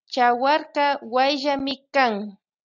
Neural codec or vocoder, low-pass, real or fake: none; 7.2 kHz; real